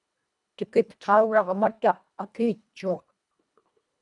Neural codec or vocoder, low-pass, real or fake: codec, 24 kHz, 1.5 kbps, HILCodec; 10.8 kHz; fake